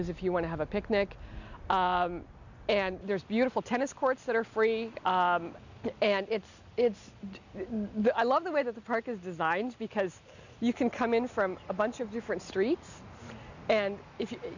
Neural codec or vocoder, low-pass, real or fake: none; 7.2 kHz; real